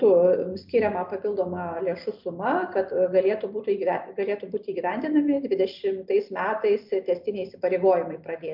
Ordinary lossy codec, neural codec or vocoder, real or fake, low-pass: MP3, 48 kbps; none; real; 5.4 kHz